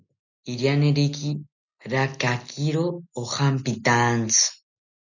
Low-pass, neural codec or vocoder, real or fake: 7.2 kHz; none; real